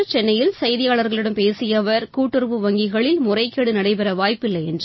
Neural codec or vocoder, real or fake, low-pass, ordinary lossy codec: none; real; 7.2 kHz; MP3, 24 kbps